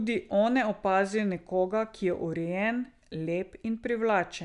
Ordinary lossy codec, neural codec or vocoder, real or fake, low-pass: none; none; real; 10.8 kHz